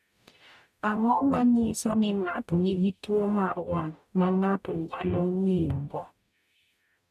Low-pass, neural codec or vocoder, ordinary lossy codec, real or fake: 14.4 kHz; codec, 44.1 kHz, 0.9 kbps, DAC; none; fake